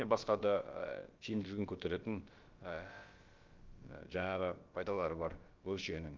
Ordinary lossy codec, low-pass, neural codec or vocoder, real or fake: Opus, 32 kbps; 7.2 kHz; codec, 16 kHz, about 1 kbps, DyCAST, with the encoder's durations; fake